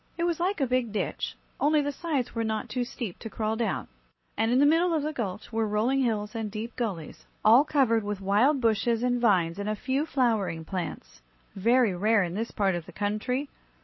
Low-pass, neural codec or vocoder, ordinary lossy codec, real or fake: 7.2 kHz; none; MP3, 24 kbps; real